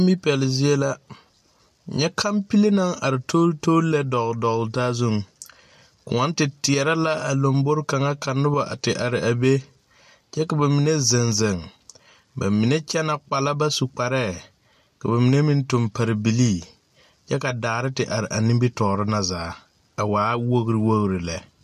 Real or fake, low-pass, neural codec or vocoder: real; 14.4 kHz; none